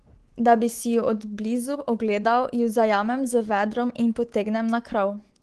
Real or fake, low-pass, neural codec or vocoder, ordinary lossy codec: fake; 10.8 kHz; codec, 24 kHz, 3.1 kbps, DualCodec; Opus, 16 kbps